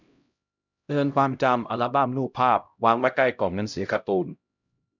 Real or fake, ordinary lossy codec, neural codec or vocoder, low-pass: fake; none; codec, 16 kHz, 0.5 kbps, X-Codec, HuBERT features, trained on LibriSpeech; 7.2 kHz